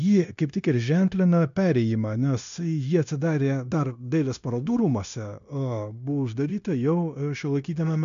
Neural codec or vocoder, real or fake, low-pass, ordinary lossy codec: codec, 16 kHz, 0.9 kbps, LongCat-Audio-Codec; fake; 7.2 kHz; MP3, 64 kbps